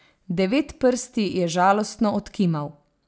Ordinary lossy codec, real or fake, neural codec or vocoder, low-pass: none; real; none; none